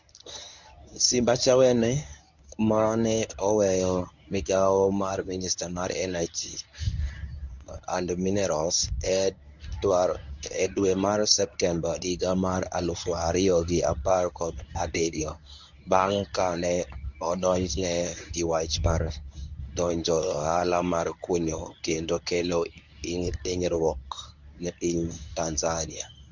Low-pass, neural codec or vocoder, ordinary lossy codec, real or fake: 7.2 kHz; codec, 24 kHz, 0.9 kbps, WavTokenizer, medium speech release version 1; none; fake